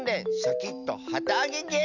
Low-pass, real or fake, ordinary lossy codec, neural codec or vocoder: 7.2 kHz; real; none; none